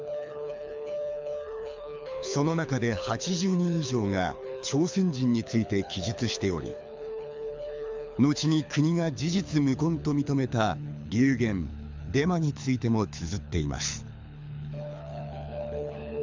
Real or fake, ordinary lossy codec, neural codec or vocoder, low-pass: fake; MP3, 64 kbps; codec, 24 kHz, 6 kbps, HILCodec; 7.2 kHz